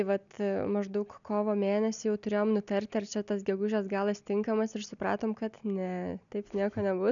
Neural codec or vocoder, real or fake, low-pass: none; real; 7.2 kHz